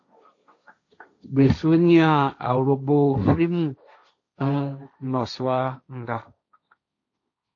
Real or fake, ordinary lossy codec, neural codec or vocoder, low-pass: fake; AAC, 48 kbps; codec, 16 kHz, 1.1 kbps, Voila-Tokenizer; 7.2 kHz